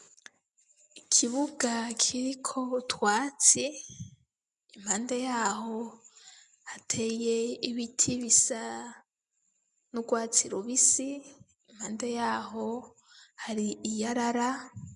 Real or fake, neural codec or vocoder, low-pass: real; none; 10.8 kHz